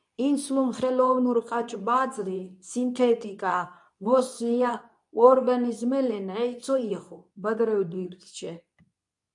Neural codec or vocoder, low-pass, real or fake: codec, 24 kHz, 0.9 kbps, WavTokenizer, medium speech release version 2; 10.8 kHz; fake